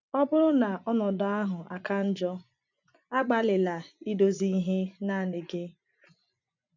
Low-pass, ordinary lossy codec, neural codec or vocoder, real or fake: 7.2 kHz; none; vocoder, 24 kHz, 100 mel bands, Vocos; fake